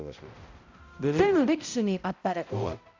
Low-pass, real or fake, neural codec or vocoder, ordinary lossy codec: 7.2 kHz; fake; codec, 16 kHz, 0.5 kbps, X-Codec, HuBERT features, trained on balanced general audio; MP3, 64 kbps